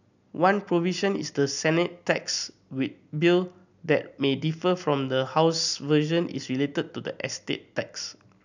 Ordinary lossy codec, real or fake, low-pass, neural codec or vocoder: none; real; 7.2 kHz; none